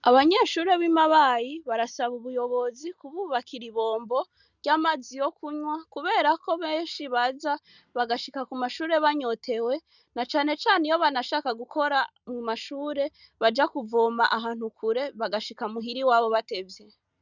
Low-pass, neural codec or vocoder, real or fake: 7.2 kHz; none; real